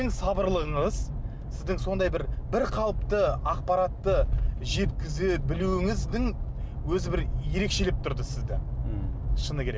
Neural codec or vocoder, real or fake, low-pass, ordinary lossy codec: none; real; none; none